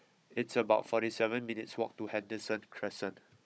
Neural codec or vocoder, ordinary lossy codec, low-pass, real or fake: codec, 16 kHz, 16 kbps, FunCodec, trained on Chinese and English, 50 frames a second; none; none; fake